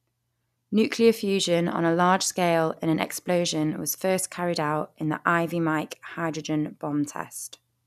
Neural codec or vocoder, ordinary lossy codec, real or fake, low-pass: none; none; real; 14.4 kHz